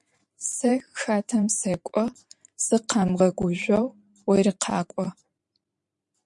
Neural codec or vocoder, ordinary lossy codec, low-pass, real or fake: vocoder, 44.1 kHz, 128 mel bands every 512 samples, BigVGAN v2; MP3, 64 kbps; 10.8 kHz; fake